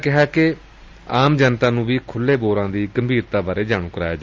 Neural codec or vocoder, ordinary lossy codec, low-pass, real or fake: none; Opus, 32 kbps; 7.2 kHz; real